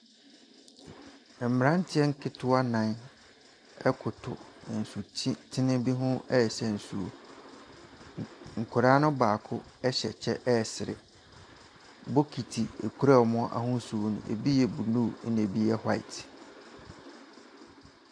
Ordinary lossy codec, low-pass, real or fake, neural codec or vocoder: AAC, 64 kbps; 9.9 kHz; real; none